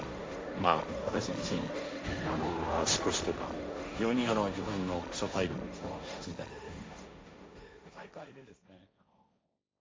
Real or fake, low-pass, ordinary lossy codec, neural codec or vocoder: fake; none; none; codec, 16 kHz, 1.1 kbps, Voila-Tokenizer